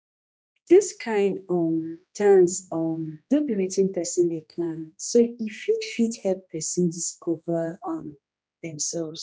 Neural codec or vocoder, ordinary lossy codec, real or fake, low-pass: codec, 16 kHz, 1 kbps, X-Codec, HuBERT features, trained on general audio; none; fake; none